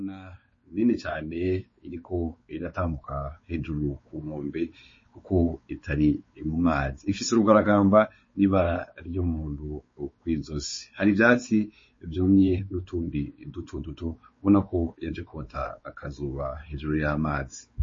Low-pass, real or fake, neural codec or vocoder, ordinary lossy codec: 7.2 kHz; fake; codec, 16 kHz, 4 kbps, X-Codec, WavLM features, trained on Multilingual LibriSpeech; MP3, 32 kbps